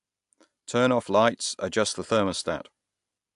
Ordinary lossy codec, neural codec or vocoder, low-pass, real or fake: AAC, 64 kbps; none; 10.8 kHz; real